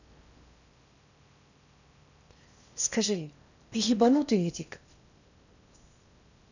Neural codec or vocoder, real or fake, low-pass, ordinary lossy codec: codec, 16 kHz in and 24 kHz out, 0.6 kbps, FocalCodec, streaming, 4096 codes; fake; 7.2 kHz; none